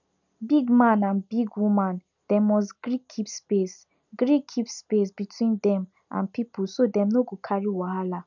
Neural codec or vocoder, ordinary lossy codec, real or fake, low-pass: none; none; real; 7.2 kHz